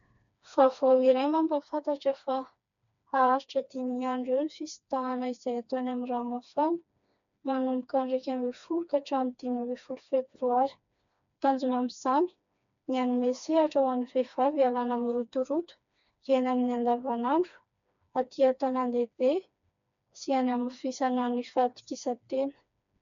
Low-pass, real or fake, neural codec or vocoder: 7.2 kHz; fake; codec, 16 kHz, 2 kbps, FreqCodec, smaller model